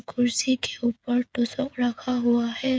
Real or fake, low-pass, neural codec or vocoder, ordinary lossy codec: fake; none; codec, 16 kHz, 8 kbps, FreqCodec, smaller model; none